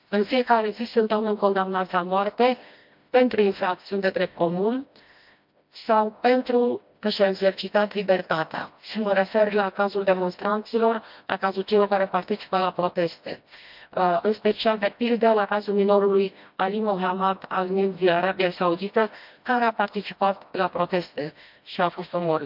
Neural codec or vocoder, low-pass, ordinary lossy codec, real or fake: codec, 16 kHz, 1 kbps, FreqCodec, smaller model; 5.4 kHz; MP3, 48 kbps; fake